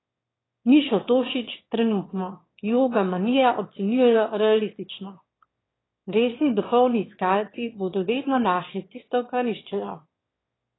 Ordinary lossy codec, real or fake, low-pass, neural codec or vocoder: AAC, 16 kbps; fake; 7.2 kHz; autoencoder, 22.05 kHz, a latent of 192 numbers a frame, VITS, trained on one speaker